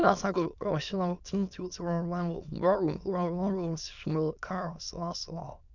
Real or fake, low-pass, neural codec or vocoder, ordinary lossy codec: fake; 7.2 kHz; autoencoder, 22.05 kHz, a latent of 192 numbers a frame, VITS, trained on many speakers; none